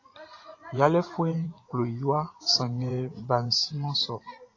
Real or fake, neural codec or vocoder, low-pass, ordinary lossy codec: fake; vocoder, 24 kHz, 100 mel bands, Vocos; 7.2 kHz; AAC, 32 kbps